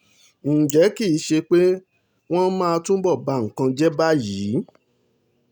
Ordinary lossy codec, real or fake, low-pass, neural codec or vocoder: none; real; 19.8 kHz; none